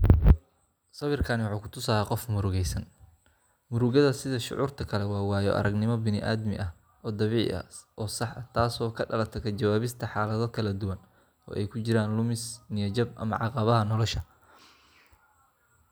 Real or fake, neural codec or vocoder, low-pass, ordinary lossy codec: real; none; none; none